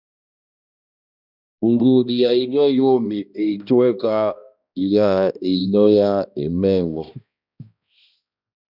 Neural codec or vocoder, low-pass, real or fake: codec, 16 kHz, 1 kbps, X-Codec, HuBERT features, trained on balanced general audio; 5.4 kHz; fake